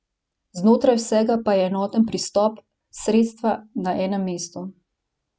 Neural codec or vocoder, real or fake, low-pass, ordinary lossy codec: none; real; none; none